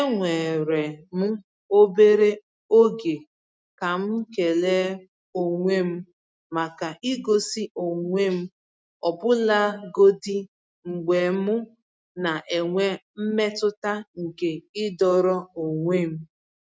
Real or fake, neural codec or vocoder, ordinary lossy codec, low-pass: real; none; none; none